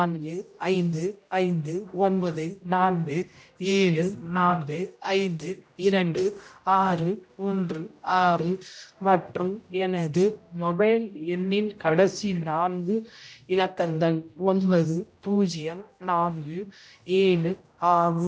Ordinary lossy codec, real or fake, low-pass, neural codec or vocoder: none; fake; none; codec, 16 kHz, 0.5 kbps, X-Codec, HuBERT features, trained on general audio